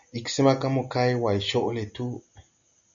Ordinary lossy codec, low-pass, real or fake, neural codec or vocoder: MP3, 96 kbps; 7.2 kHz; real; none